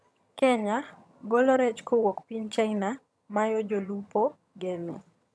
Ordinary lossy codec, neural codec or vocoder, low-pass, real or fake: none; vocoder, 22.05 kHz, 80 mel bands, HiFi-GAN; none; fake